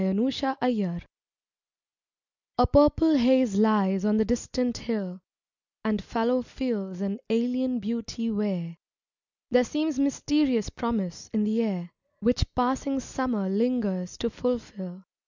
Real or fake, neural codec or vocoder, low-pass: real; none; 7.2 kHz